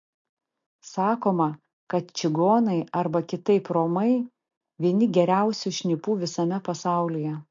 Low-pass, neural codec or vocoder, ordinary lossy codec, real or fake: 7.2 kHz; none; MP3, 48 kbps; real